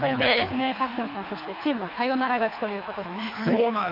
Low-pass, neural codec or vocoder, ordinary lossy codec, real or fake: 5.4 kHz; codec, 24 kHz, 3 kbps, HILCodec; AAC, 32 kbps; fake